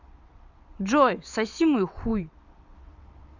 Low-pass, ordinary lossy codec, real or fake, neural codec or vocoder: 7.2 kHz; none; fake; vocoder, 22.05 kHz, 80 mel bands, WaveNeXt